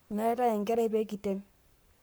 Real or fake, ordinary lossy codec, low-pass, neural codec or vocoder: fake; none; none; codec, 44.1 kHz, 7.8 kbps, Pupu-Codec